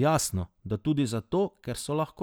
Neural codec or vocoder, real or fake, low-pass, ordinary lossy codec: none; real; none; none